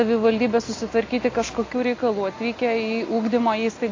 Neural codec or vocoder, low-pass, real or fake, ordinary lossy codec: none; 7.2 kHz; real; AAC, 32 kbps